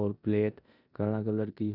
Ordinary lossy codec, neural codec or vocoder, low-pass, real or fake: none; codec, 16 kHz in and 24 kHz out, 0.9 kbps, LongCat-Audio-Codec, four codebook decoder; 5.4 kHz; fake